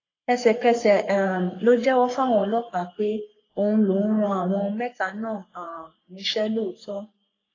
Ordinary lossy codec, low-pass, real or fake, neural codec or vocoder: AAC, 32 kbps; 7.2 kHz; fake; codec, 44.1 kHz, 3.4 kbps, Pupu-Codec